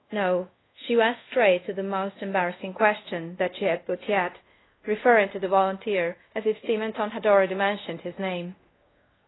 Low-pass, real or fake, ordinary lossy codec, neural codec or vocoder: 7.2 kHz; fake; AAC, 16 kbps; codec, 24 kHz, 0.5 kbps, DualCodec